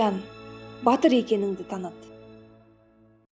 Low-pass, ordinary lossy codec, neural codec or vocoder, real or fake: none; none; none; real